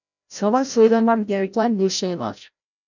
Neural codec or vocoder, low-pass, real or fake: codec, 16 kHz, 0.5 kbps, FreqCodec, larger model; 7.2 kHz; fake